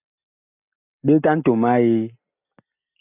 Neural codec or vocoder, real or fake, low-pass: none; real; 3.6 kHz